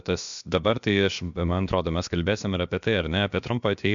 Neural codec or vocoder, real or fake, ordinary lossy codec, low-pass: codec, 16 kHz, about 1 kbps, DyCAST, with the encoder's durations; fake; MP3, 64 kbps; 7.2 kHz